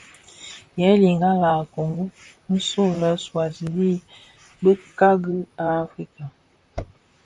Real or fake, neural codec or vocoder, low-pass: fake; vocoder, 44.1 kHz, 128 mel bands, Pupu-Vocoder; 10.8 kHz